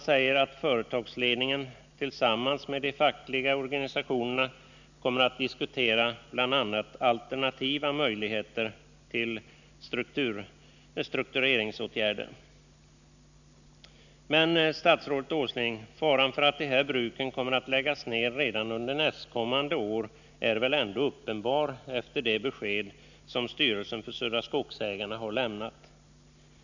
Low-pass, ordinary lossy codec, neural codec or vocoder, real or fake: 7.2 kHz; none; none; real